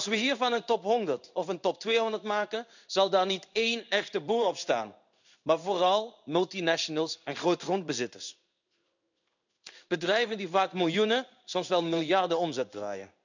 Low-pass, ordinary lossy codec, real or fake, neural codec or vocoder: 7.2 kHz; none; fake; codec, 16 kHz in and 24 kHz out, 1 kbps, XY-Tokenizer